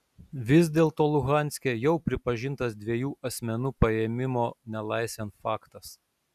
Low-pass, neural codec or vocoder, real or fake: 14.4 kHz; none; real